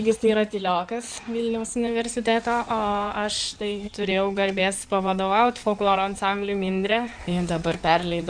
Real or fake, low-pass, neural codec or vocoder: fake; 9.9 kHz; codec, 16 kHz in and 24 kHz out, 2.2 kbps, FireRedTTS-2 codec